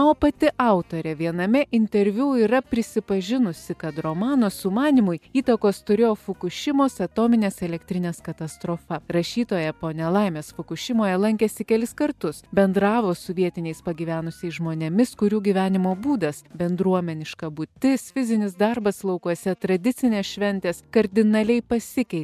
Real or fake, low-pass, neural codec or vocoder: real; 14.4 kHz; none